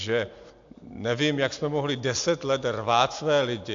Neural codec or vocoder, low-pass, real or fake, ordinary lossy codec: none; 7.2 kHz; real; AAC, 64 kbps